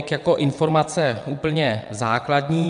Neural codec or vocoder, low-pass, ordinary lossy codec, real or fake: vocoder, 22.05 kHz, 80 mel bands, WaveNeXt; 9.9 kHz; AAC, 96 kbps; fake